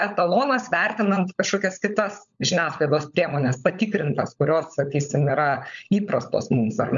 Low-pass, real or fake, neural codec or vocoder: 7.2 kHz; fake; codec, 16 kHz, 16 kbps, FunCodec, trained on LibriTTS, 50 frames a second